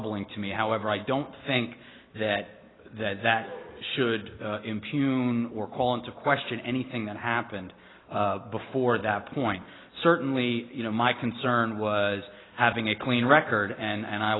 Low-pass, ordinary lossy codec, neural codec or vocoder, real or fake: 7.2 kHz; AAC, 16 kbps; none; real